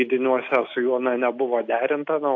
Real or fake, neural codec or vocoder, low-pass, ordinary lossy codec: real; none; 7.2 kHz; AAC, 48 kbps